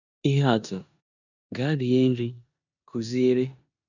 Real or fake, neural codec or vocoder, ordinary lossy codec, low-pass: fake; codec, 16 kHz in and 24 kHz out, 0.9 kbps, LongCat-Audio-Codec, four codebook decoder; none; 7.2 kHz